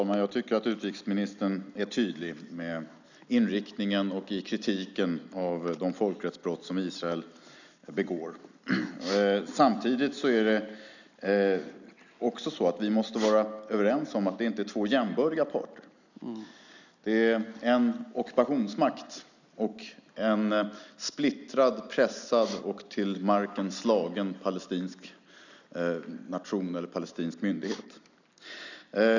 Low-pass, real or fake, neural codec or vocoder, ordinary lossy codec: 7.2 kHz; real; none; none